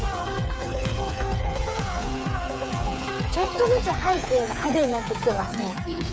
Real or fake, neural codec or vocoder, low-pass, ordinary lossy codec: fake; codec, 16 kHz, 8 kbps, FreqCodec, smaller model; none; none